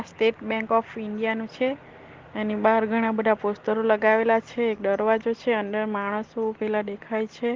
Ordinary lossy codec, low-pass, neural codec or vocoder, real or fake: Opus, 16 kbps; 7.2 kHz; none; real